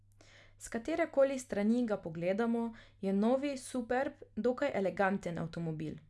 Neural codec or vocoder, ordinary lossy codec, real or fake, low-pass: none; none; real; none